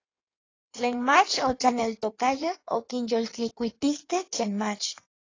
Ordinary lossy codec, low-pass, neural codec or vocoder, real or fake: AAC, 32 kbps; 7.2 kHz; codec, 16 kHz in and 24 kHz out, 1.1 kbps, FireRedTTS-2 codec; fake